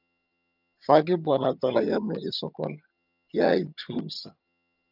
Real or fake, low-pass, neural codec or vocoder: fake; 5.4 kHz; vocoder, 22.05 kHz, 80 mel bands, HiFi-GAN